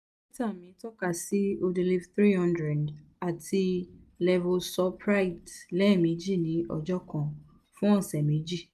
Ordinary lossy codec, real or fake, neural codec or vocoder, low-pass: none; real; none; 14.4 kHz